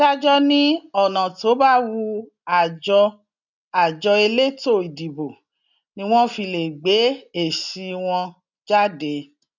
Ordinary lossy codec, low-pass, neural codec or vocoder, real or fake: none; 7.2 kHz; none; real